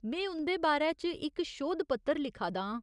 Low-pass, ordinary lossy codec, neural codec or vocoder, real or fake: 10.8 kHz; none; none; real